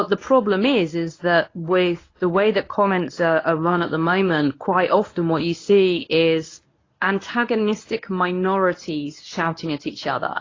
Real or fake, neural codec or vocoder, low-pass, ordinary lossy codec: fake; codec, 24 kHz, 0.9 kbps, WavTokenizer, medium speech release version 1; 7.2 kHz; AAC, 32 kbps